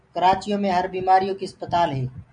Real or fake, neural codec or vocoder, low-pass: real; none; 9.9 kHz